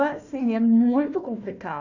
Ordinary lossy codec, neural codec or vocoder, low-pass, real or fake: none; codec, 16 kHz, 1 kbps, FunCodec, trained on Chinese and English, 50 frames a second; 7.2 kHz; fake